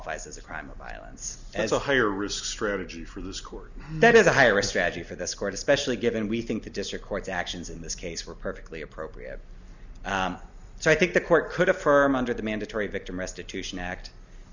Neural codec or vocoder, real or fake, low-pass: none; real; 7.2 kHz